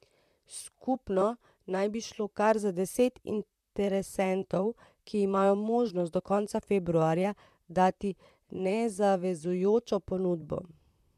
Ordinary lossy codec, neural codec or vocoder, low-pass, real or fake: MP3, 96 kbps; vocoder, 44.1 kHz, 128 mel bands, Pupu-Vocoder; 14.4 kHz; fake